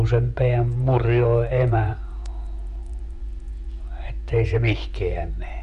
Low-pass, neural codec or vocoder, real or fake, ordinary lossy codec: 10.8 kHz; vocoder, 24 kHz, 100 mel bands, Vocos; fake; none